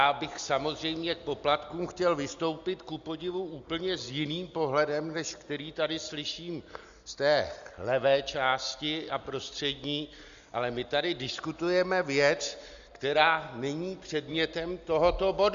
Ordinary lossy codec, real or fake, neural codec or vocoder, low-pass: Opus, 64 kbps; real; none; 7.2 kHz